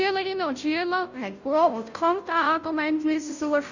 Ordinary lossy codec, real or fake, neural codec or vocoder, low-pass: none; fake; codec, 16 kHz, 0.5 kbps, FunCodec, trained on Chinese and English, 25 frames a second; 7.2 kHz